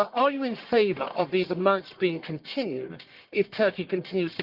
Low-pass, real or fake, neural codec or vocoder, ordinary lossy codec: 5.4 kHz; fake; codec, 44.1 kHz, 1.7 kbps, Pupu-Codec; Opus, 32 kbps